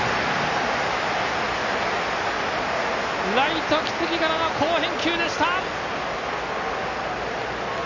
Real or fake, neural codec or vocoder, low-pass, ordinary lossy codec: real; none; 7.2 kHz; none